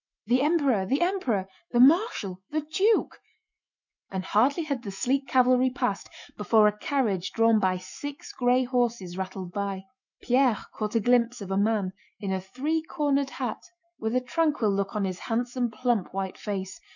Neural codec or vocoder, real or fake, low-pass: autoencoder, 48 kHz, 128 numbers a frame, DAC-VAE, trained on Japanese speech; fake; 7.2 kHz